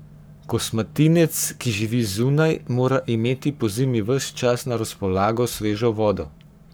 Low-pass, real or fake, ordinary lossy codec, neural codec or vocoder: none; fake; none; codec, 44.1 kHz, 7.8 kbps, Pupu-Codec